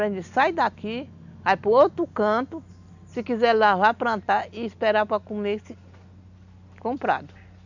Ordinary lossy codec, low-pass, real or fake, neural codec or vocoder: none; 7.2 kHz; real; none